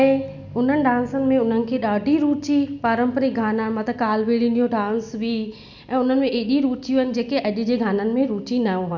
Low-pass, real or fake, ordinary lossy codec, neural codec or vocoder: 7.2 kHz; real; none; none